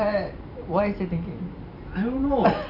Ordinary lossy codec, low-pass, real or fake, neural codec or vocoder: none; 5.4 kHz; fake; vocoder, 44.1 kHz, 128 mel bands every 512 samples, BigVGAN v2